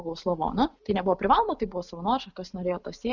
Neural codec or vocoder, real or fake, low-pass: none; real; 7.2 kHz